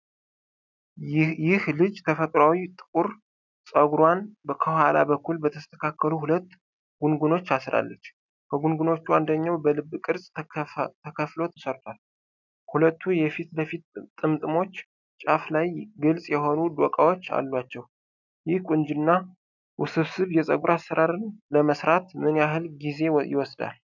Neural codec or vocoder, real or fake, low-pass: none; real; 7.2 kHz